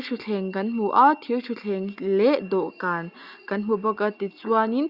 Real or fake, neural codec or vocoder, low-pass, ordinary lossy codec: real; none; 5.4 kHz; Opus, 64 kbps